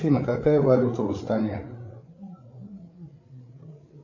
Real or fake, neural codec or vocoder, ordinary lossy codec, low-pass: fake; codec, 16 kHz, 8 kbps, FreqCodec, larger model; AAC, 48 kbps; 7.2 kHz